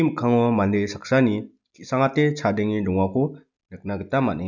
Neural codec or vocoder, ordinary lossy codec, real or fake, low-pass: none; none; real; 7.2 kHz